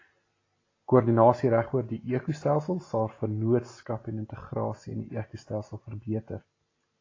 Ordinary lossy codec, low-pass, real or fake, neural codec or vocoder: AAC, 32 kbps; 7.2 kHz; real; none